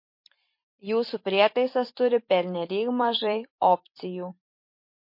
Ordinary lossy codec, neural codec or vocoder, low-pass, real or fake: MP3, 32 kbps; vocoder, 44.1 kHz, 128 mel bands every 256 samples, BigVGAN v2; 5.4 kHz; fake